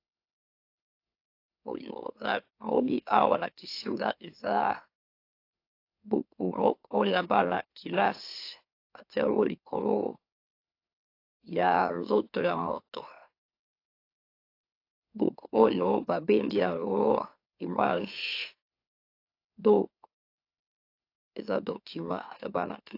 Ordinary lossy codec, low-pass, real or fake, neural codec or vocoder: AAC, 32 kbps; 5.4 kHz; fake; autoencoder, 44.1 kHz, a latent of 192 numbers a frame, MeloTTS